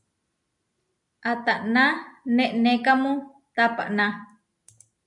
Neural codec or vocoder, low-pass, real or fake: none; 10.8 kHz; real